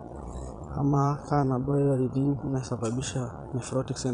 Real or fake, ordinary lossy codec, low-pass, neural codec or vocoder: fake; none; 9.9 kHz; vocoder, 22.05 kHz, 80 mel bands, Vocos